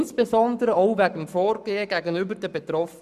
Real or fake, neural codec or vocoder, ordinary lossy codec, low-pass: fake; codec, 44.1 kHz, 7.8 kbps, Pupu-Codec; none; 14.4 kHz